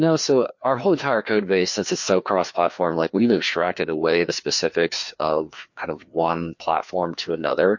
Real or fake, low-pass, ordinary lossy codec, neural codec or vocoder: fake; 7.2 kHz; MP3, 48 kbps; codec, 16 kHz, 2 kbps, FreqCodec, larger model